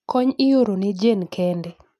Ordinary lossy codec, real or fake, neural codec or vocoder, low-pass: none; real; none; 14.4 kHz